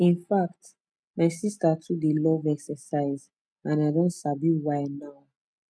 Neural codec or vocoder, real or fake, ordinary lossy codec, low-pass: none; real; none; none